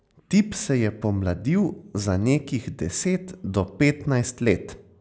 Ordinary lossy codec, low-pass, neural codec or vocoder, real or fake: none; none; none; real